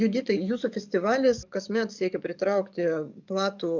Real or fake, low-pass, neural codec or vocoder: fake; 7.2 kHz; codec, 44.1 kHz, 7.8 kbps, DAC